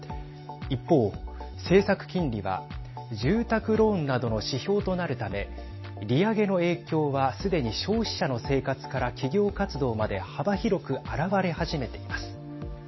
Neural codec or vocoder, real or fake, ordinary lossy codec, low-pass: none; real; MP3, 24 kbps; 7.2 kHz